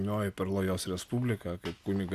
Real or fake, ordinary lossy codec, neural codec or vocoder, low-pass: real; Opus, 64 kbps; none; 14.4 kHz